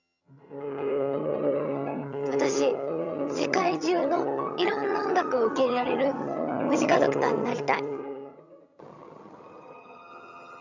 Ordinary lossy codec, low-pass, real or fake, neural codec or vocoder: none; 7.2 kHz; fake; vocoder, 22.05 kHz, 80 mel bands, HiFi-GAN